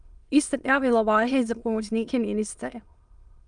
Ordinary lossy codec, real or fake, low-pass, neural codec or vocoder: Opus, 32 kbps; fake; 9.9 kHz; autoencoder, 22.05 kHz, a latent of 192 numbers a frame, VITS, trained on many speakers